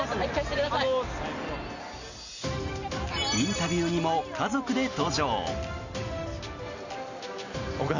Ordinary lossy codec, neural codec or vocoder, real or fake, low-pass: none; none; real; 7.2 kHz